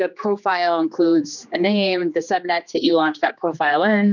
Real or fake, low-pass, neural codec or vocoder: fake; 7.2 kHz; codec, 16 kHz, 2 kbps, X-Codec, HuBERT features, trained on general audio